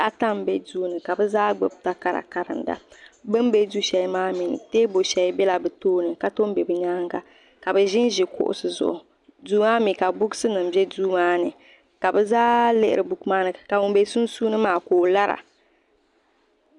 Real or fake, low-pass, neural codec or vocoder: real; 10.8 kHz; none